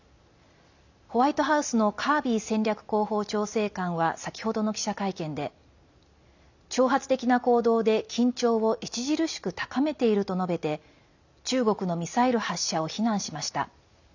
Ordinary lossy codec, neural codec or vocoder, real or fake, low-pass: none; none; real; 7.2 kHz